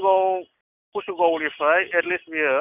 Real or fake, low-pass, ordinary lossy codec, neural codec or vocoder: real; 3.6 kHz; none; none